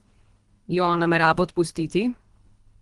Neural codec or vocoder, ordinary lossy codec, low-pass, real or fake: codec, 24 kHz, 3 kbps, HILCodec; Opus, 24 kbps; 10.8 kHz; fake